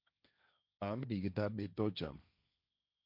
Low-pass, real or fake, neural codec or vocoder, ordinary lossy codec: 5.4 kHz; fake; codec, 16 kHz, 1.1 kbps, Voila-Tokenizer; MP3, 48 kbps